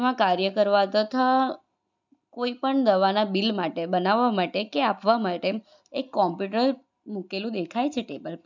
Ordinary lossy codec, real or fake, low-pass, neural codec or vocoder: none; real; 7.2 kHz; none